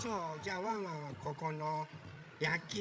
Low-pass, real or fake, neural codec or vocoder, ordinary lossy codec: none; fake; codec, 16 kHz, 16 kbps, FreqCodec, larger model; none